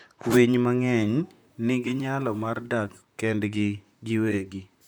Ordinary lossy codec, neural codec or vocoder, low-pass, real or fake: none; vocoder, 44.1 kHz, 128 mel bands, Pupu-Vocoder; none; fake